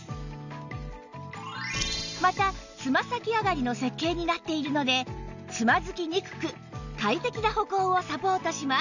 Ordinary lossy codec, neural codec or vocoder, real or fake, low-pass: none; none; real; 7.2 kHz